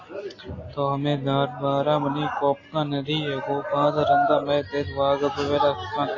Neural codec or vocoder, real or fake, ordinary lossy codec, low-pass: none; real; AAC, 32 kbps; 7.2 kHz